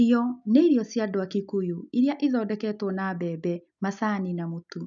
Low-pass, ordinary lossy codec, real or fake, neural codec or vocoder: 7.2 kHz; none; real; none